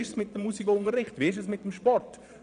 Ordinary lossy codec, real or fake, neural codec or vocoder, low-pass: none; fake; vocoder, 22.05 kHz, 80 mel bands, Vocos; 9.9 kHz